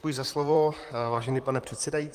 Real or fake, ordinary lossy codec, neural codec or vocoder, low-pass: fake; Opus, 32 kbps; vocoder, 44.1 kHz, 128 mel bands, Pupu-Vocoder; 14.4 kHz